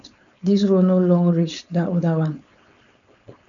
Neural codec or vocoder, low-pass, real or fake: codec, 16 kHz, 4.8 kbps, FACodec; 7.2 kHz; fake